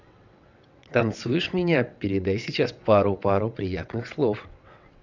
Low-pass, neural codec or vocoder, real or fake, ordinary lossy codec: 7.2 kHz; vocoder, 22.05 kHz, 80 mel bands, WaveNeXt; fake; none